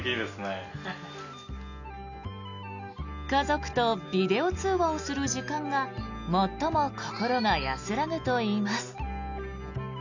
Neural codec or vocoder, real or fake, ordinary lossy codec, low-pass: none; real; none; 7.2 kHz